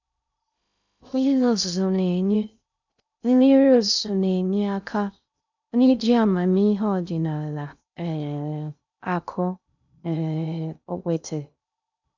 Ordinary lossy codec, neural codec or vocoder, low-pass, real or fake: none; codec, 16 kHz in and 24 kHz out, 0.6 kbps, FocalCodec, streaming, 2048 codes; 7.2 kHz; fake